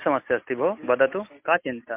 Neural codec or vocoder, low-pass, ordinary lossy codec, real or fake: none; 3.6 kHz; MP3, 32 kbps; real